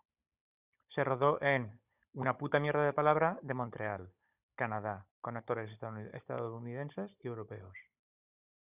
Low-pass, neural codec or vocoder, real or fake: 3.6 kHz; codec, 16 kHz, 16 kbps, FunCodec, trained on Chinese and English, 50 frames a second; fake